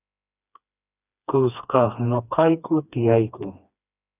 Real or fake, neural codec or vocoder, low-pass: fake; codec, 16 kHz, 2 kbps, FreqCodec, smaller model; 3.6 kHz